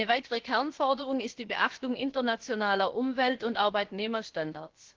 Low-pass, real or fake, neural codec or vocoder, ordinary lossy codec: 7.2 kHz; fake; codec, 16 kHz, about 1 kbps, DyCAST, with the encoder's durations; Opus, 16 kbps